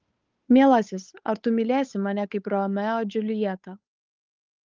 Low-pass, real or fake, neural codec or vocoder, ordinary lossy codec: 7.2 kHz; fake; codec, 16 kHz, 8 kbps, FunCodec, trained on Chinese and English, 25 frames a second; Opus, 24 kbps